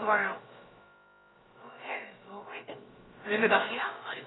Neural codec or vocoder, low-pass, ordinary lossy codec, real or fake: codec, 16 kHz, about 1 kbps, DyCAST, with the encoder's durations; 7.2 kHz; AAC, 16 kbps; fake